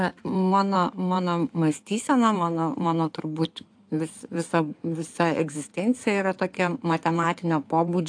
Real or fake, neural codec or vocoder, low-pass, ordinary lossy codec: fake; codec, 16 kHz in and 24 kHz out, 2.2 kbps, FireRedTTS-2 codec; 9.9 kHz; AAC, 64 kbps